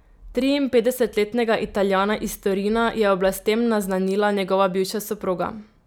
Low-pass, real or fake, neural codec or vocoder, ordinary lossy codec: none; real; none; none